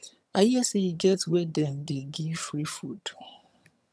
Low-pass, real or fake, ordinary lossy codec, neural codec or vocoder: none; fake; none; vocoder, 22.05 kHz, 80 mel bands, HiFi-GAN